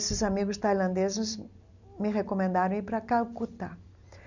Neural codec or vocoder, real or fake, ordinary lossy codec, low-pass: none; real; none; 7.2 kHz